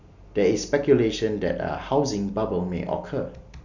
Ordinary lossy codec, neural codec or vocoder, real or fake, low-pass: none; none; real; 7.2 kHz